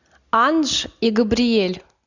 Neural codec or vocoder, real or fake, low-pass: none; real; 7.2 kHz